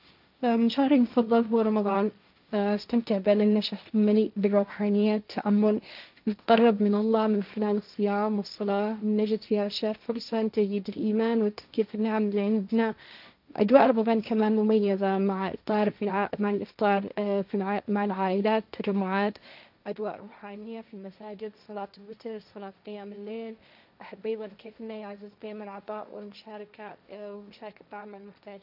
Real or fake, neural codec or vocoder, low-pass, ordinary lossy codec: fake; codec, 16 kHz, 1.1 kbps, Voila-Tokenizer; 5.4 kHz; none